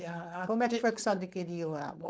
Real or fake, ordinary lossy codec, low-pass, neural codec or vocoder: fake; none; none; codec, 16 kHz, 4.8 kbps, FACodec